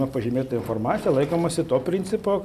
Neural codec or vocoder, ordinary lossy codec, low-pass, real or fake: none; MP3, 96 kbps; 14.4 kHz; real